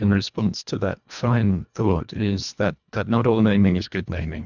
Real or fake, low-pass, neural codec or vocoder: fake; 7.2 kHz; codec, 24 kHz, 1.5 kbps, HILCodec